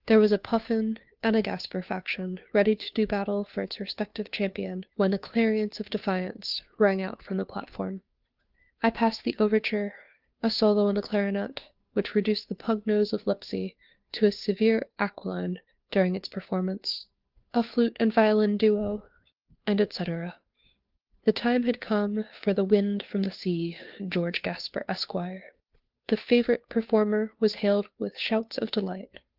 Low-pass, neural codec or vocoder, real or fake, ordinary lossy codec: 5.4 kHz; codec, 16 kHz, 2 kbps, FunCodec, trained on Chinese and English, 25 frames a second; fake; Opus, 32 kbps